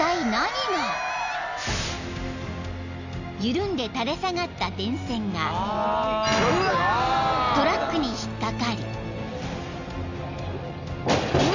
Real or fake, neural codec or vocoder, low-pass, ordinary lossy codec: real; none; 7.2 kHz; none